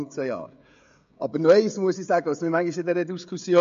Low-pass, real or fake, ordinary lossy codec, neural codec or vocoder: 7.2 kHz; fake; MP3, 48 kbps; codec, 16 kHz, 16 kbps, FreqCodec, smaller model